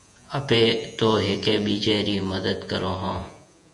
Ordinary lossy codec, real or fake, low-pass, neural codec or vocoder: MP3, 64 kbps; fake; 10.8 kHz; vocoder, 48 kHz, 128 mel bands, Vocos